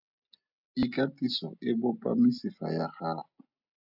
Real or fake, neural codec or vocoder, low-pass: real; none; 5.4 kHz